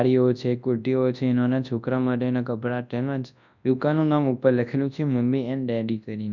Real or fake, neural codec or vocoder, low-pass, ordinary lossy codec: fake; codec, 24 kHz, 0.9 kbps, WavTokenizer, large speech release; 7.2 kHz; none